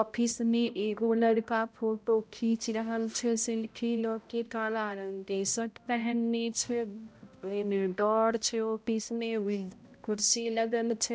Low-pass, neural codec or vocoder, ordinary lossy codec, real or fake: none; codec, 16 kHz, 0.5 kbps, X-Codec, HuBERT features, trained on balanced general audio; none; fake